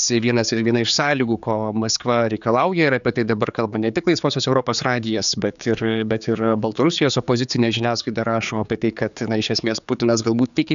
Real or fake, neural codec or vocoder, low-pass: fake; codec, 16 kHz, 4 kbps, X-Codec, HuBERT features, trained on general audio; 7.2 kHz